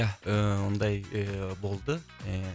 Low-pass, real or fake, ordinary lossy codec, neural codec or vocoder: none; real; none; none